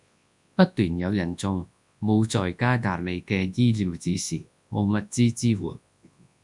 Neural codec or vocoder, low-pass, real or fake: codec, 24 kHz, 0.9 kbps, WavTokenizer, large speech release; 10.8 kHz; fake